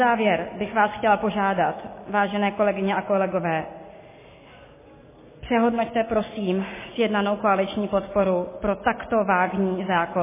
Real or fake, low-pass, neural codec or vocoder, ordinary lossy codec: real; 3.6 kHz; none; MP3, 16 kbps